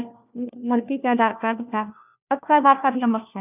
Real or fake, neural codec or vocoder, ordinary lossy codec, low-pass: fake; codec, 16 kHz, 1 kbps, FunCodec, trained on LibriTTS, 50 frames a second; none; 3.6 kHz